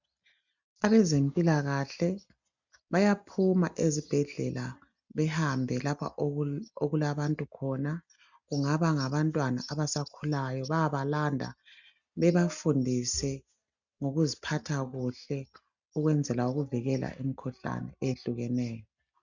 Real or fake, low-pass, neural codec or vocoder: real; 7.2 kHz; none